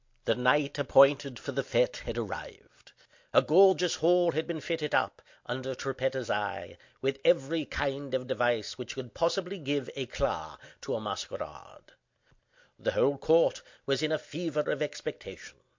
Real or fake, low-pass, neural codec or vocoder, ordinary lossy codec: real; 7.2 kHz; none; MP3, 48 kbps